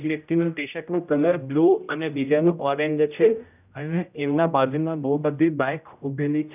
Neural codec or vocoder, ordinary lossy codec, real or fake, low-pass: codec, 16 kHz, 0.5 kbps, X-Codec, HuBERT features, trained on general audio; none; fake; 3.6 kHz